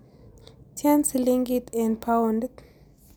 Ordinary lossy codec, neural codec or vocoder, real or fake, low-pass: none; none; real; none